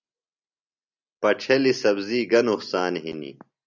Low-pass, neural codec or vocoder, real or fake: 7.2 kHz; none; real